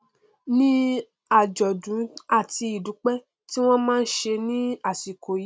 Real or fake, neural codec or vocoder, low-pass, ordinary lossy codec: real; none; none; none